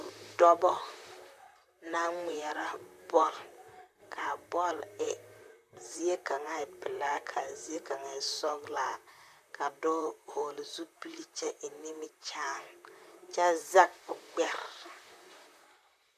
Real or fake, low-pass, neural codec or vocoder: fake; 14.4 kHz; vocoder, 44.1 kHz, 128 mel bands, Pupu-Vocoder